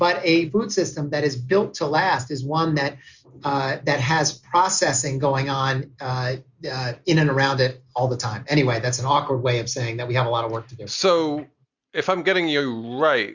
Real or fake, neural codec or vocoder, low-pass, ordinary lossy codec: real; none; 7.2 kHz; Opus, 64 kbps